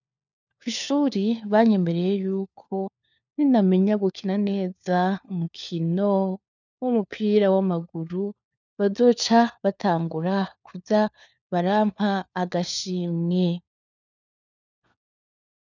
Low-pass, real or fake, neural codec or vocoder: 7.2 kHz; fake; codec, 16 kHz, 4 kbps, FunCodec, trained on LibriTTS, 50 frames a second